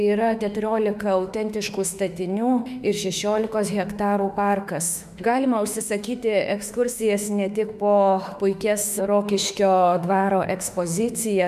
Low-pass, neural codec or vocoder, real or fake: 14.4 kHz; autoencoder, 48 kHz, 32 numbers a frame, DAC-VAE, trained on Japanese speech; fake